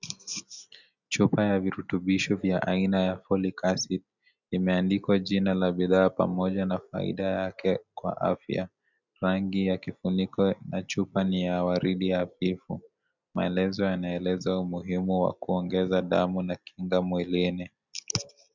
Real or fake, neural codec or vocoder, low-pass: real; none; 7.2 kHz